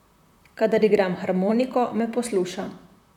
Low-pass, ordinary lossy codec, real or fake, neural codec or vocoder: 19.8 kHz; none; fake; vocoder, 44.1 kHz, 128 mel bands, Pupu-Vocoder